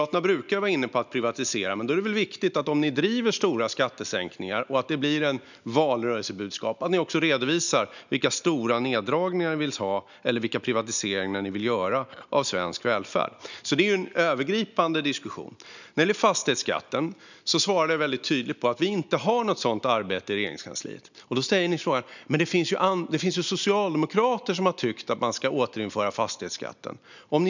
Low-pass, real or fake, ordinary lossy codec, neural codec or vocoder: 7.2 kHz; real; none; none